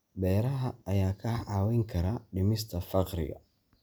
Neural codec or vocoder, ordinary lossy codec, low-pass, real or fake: none; none; none; real